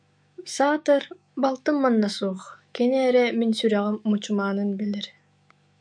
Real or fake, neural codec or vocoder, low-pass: fake; autoencoder, 48 kHz, 128 numbers a frame, DAC-VAE, trained on Japanese speech; 9.9 kHz